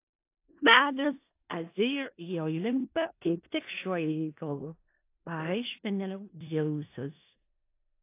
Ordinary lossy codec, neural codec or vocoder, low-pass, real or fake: AAC, 24 kbps; codec, 16 kHz in and 24 kHz out, 0.4 kbps, LongCat-Audio-Codec, four codebook decoder; 3.6 kHz; fake